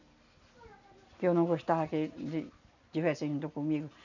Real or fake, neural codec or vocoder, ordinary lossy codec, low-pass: real; none; none; 7.2 kHz